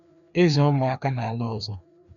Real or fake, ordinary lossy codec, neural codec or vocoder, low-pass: fake; none; codec, 16 kHz, 2 kbps, FreqCodec, larger model; 7.2 kHz